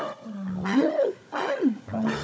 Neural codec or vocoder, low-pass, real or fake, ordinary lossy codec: codec, 16 kHz, 16 kbps, FunCodec, trained on Chinese and English, 50 frames a second; none; fake; none